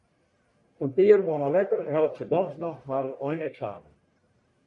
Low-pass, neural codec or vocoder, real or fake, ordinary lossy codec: 10.8 kHz; codec, 44.1 kHz, 1.7 kbps, Pupu-Codec; fake; MP3, 96 kbps